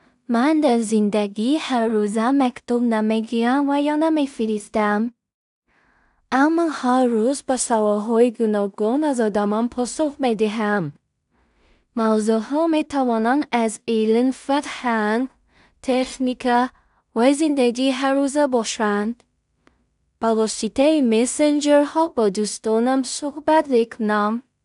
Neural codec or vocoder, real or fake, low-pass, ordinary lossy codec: codec, 16 kHz in and 24 kHz out, 0.4 kbps, LongCat-Audio-Codec, two codebook decoder; fake; 10.8 kHz; none